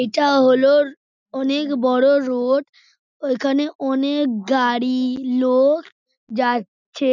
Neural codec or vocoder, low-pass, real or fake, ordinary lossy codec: none; 7.2 kHz; real; none